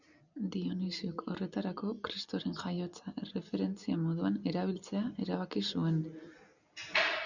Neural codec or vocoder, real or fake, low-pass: none; real; 7.2 kHz